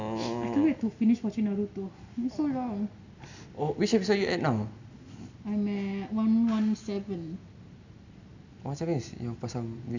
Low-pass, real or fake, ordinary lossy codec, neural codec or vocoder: 7.2 kHz; real; none; none